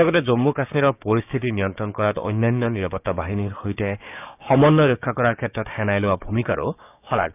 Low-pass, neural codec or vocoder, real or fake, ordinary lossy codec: 3.6 kHz; codec, 16 kHz, 6 kbps, DAC; fake; none